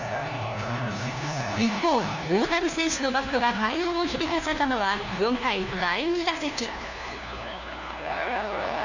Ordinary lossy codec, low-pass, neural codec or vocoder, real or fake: none; 7.2 kHz; codec, 16 kHz, 1 kbps, FunCodec, trained on LibriTTS, 50 frames a second; fake